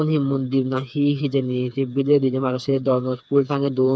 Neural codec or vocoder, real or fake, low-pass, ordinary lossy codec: codec, 16 kHz, 4 kbps, FreqCodec, smaller model; fake; none; none